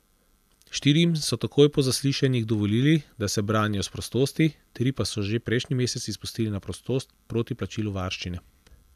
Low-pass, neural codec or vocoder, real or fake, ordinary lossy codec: 14.4 kHz; none; real; none